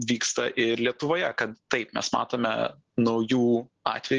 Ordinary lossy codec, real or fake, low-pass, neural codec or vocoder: Opus, 16 kbps; real; 7.2 kHz; none